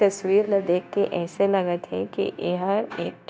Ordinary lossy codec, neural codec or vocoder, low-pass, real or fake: none; codec, 16 kHz, 0.9 kbps, LongCat-Audio-Codec; none; fake